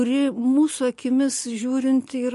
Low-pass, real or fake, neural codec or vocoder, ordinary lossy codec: 14.4 kHz; real; none; MP3, 48 kbps